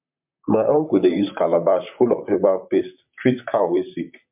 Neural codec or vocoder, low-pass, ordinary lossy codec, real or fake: vocoder, 44.1 kHz, 128 mel bands, Pupu-Vocoder; 3.6 kHz; MP3, 32 kbps; fake